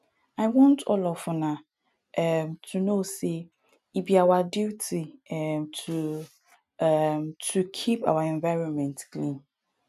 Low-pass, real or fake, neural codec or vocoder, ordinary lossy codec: 14.4 kHz; real; none; none